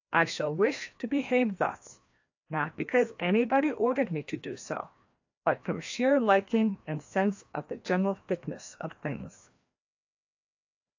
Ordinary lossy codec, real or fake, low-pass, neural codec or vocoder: AAC, 48 kbps; fake; 7.2 kHz; codec, 16 kHz, 1 kbps, FreqCodec, larger model